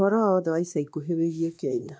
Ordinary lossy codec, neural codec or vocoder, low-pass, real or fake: none; codec, 16 kHz, 2 kbps, X-Codec, WavLM features, trained on Multilingual LibriSpeech; none; fake